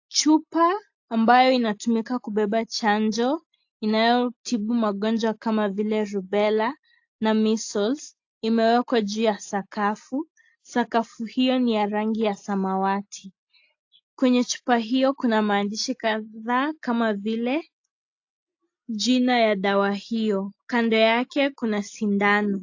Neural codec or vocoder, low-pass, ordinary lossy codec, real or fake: none; 7.2 kHz; AAC, 48 kbps; real